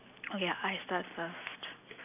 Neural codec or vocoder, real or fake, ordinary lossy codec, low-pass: none; real; none; 3.6 kHz